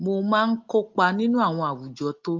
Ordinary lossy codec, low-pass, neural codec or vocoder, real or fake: Opus, 24 kbps; 7.2 kHz; none; real